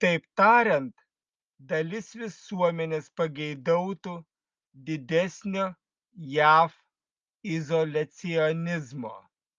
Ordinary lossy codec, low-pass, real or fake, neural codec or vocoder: Opus, 24 kbps; 7.2 kHz; real; none